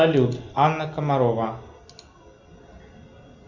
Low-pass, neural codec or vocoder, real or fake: 7.2 kHz; none; real